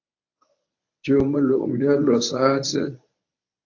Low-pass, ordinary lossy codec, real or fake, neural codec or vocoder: 7.2 kHz; AAC, 48 kbps; fake; codec, 24 kHz, 0.9 kbps, WavTokenizer, medium speech release version 1